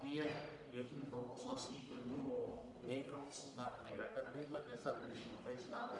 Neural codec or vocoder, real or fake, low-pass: codec, 44.1 kHz, 1.7 kbps, Pupu-Codec; fake; 10.8 kHz